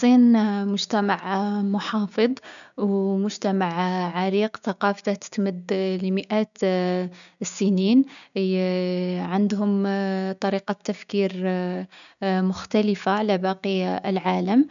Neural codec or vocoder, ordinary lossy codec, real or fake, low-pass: codec, 16 kHz, 6 kbps, DAC; none; fake; 7.2 kHz